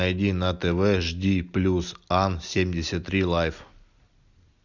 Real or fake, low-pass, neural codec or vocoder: real; 7.2 kHz; none